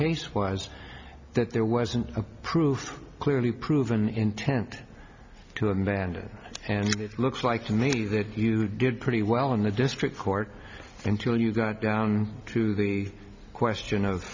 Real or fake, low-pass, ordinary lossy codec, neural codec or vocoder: real; 7.2 kHz; MP3, 64 kbps; none